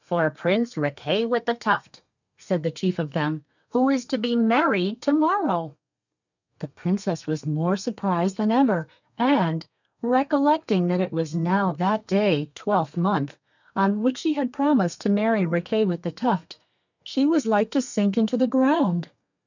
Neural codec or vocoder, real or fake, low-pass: codec, 32 kHz, 1.9 kbps, SNAC; fake; 7.2 kHz